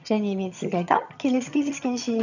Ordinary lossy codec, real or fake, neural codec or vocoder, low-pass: none; fake; vocoder, 22.05 kHz, 80 mel bands, HiFi-GAN; 7.2 kHz